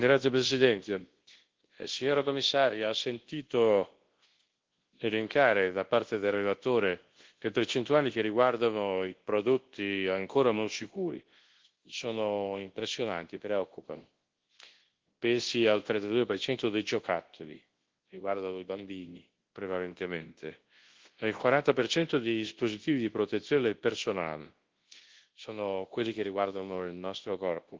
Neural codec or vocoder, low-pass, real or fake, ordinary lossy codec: codec, 24 kHz, 0.9 kbps, WavTokenizer, large speech release; 7.2 kHz; fake; Opus, 16 kbps